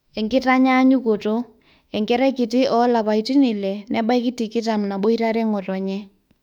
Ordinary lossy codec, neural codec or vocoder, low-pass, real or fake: none; autoencoder, 48 kHz, 32 numbers a frame, DAC-VAE, trained on Japanese speech; 19.8 kHz; fake